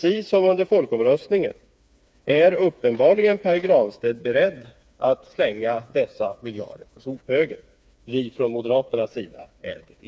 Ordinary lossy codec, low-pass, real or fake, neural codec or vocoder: none; none; fake; codec, 16 kHz, 4 kbps, FreqCodec, smaller model